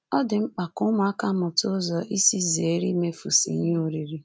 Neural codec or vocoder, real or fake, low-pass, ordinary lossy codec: none; real; none; none